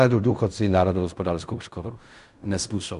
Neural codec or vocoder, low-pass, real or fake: codec, 16 kHz in and 24 kHz out, 0.4 kbps, LongCat-Audio-Codec, fine tuned four codebook decoder; 10.8 kHz; fake